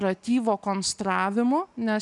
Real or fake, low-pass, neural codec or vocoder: real; 10.8 kHz; none